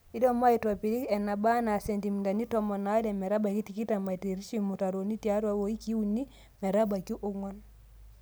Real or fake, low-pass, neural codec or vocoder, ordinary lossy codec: real; none; none; none